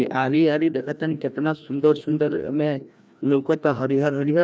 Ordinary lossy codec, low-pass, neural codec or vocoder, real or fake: none; none; codec, 16 kHz, 1 kbps, FreqCodec, larger model; fake